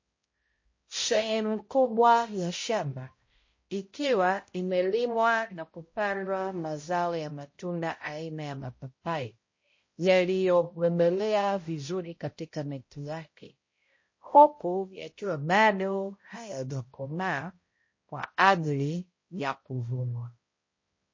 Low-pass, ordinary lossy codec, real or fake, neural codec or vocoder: 7.2 kHz; MP3, 32 kbps; fake; codec, 16 kHz, 0.5 kbps, X-Codec, HuBERT features, trained on balanced general audio